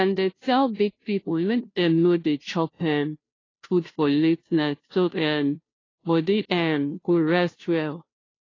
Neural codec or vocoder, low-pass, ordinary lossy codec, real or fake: codec, 16 kHz, 0.5 kbps, FunCodec, trained on Chinese and English, 25 frames a second; 7.2 kHz; AAC, 32 kbps; fake